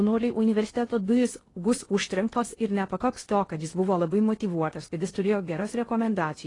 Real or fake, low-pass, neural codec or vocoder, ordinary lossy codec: fake; 10.8 kHz; codec, 16 kHz in and 24 kHz out, 0.6 kbps, FocalCodec, streaming, 2048 codes; AAC, 32 kbps